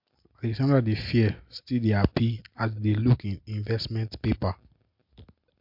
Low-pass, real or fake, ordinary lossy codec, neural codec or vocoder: 5.4 kHz; real; MP3, 48 kbps; none